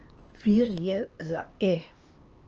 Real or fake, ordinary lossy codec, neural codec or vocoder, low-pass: fake; Opus, 24 kbps; codec, 16 kHz, 2 kbps, X-Codec, HuBERT features, trained on LibriSpeech; 7.2 kHz